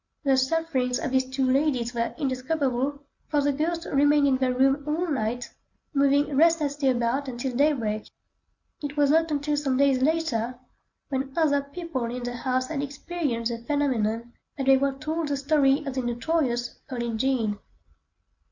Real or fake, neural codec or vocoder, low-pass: real; none; 7.2 kHz